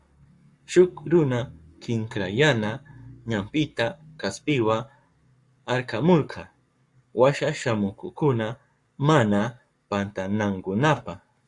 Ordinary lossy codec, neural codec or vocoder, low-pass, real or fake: Opus, 64 kbps; codec, 44.1 kHz, 7.8 kbps, Pupu-Codec; 10.8 kHz; fake